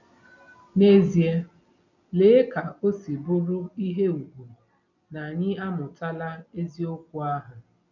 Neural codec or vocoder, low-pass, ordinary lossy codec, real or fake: none; 7.2 kHz; none; real